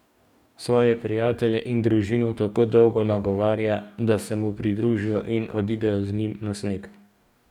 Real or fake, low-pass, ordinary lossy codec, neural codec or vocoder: fake; 19.8 kHz; none; codec, 44.1 kHz, 2.6 kbps, DAC